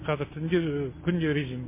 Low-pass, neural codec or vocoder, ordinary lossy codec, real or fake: 3.6 kHz; none; MP3, 16 kbps; real